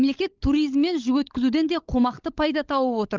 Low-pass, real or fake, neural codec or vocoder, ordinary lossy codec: 7.2 kHz; fake; codec, 16 kHz, 16 kbps, FunCodec, trained on LibriTTS, 50 frames a second; Opus, 24 kbps